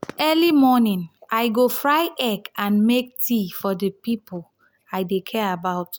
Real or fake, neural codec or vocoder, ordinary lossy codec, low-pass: real; none; none; none